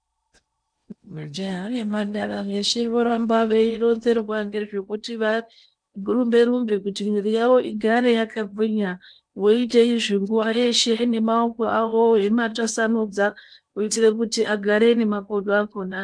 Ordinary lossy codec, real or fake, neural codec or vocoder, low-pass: MP3, 96 kbps; fake; codec, 16 kHz in and 24 kHz out, 0.8 kbps, FocalCodec, streaming, 65536 codes; 9.9 kHz